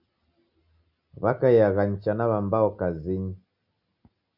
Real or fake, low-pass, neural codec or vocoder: real; 5.4 kHz; none